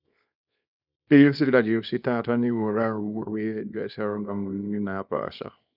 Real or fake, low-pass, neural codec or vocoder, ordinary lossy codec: fake; 5.4 kHz; codec, 24 kHz, 0.9 kbps, WavTokenizer, small release; none